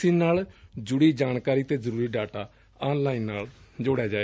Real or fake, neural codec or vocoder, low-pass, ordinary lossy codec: real; none; none; none